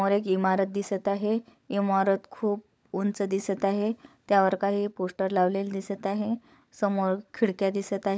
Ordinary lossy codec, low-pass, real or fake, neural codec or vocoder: none; none; fake; codec, 16 kHz, 16 kbps, FunCodec, trained on LibriTTS, 50 frames a second